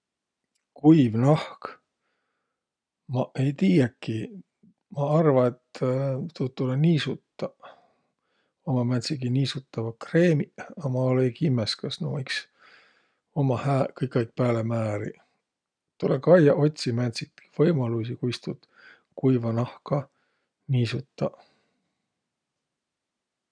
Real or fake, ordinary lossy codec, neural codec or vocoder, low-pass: real; none; none; 9.9 kHz